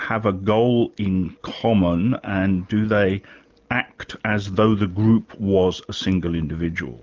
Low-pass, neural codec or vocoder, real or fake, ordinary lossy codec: 7.2 kHz; none; real; Opus, 24 kbps